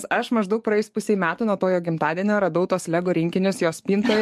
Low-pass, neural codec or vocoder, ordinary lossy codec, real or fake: 14.4 kHz; codec, 44.1 kHz, 7.8 kbps, DAC; MP3, 64 kbps; fake